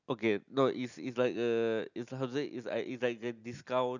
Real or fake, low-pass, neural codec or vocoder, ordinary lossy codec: real; 7.2 kHz; none; none